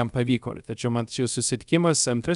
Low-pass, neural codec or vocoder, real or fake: 10.8 kHz; codec, 24 kHz, 0.5 kbps, DualCodec; fake